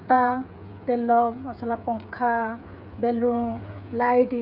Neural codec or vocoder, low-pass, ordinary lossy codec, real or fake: codec, 16 kHz, 8 kbps, FreqCodec, smaller model; 5.4 kHz; none; fake